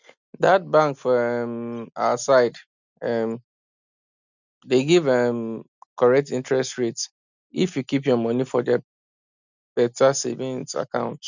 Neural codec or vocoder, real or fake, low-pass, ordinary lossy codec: none; real; 7.2 kHz; none